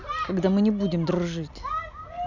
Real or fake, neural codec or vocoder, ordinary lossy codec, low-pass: real; none; none; 7.2 kHz